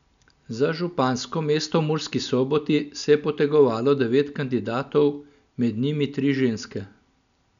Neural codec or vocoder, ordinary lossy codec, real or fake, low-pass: none; none; real; 7.2 kHz